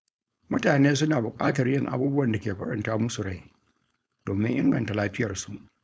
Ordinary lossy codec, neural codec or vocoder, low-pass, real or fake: none; codec, 16 kHz, 4.8 kbps, FACodec; none; fake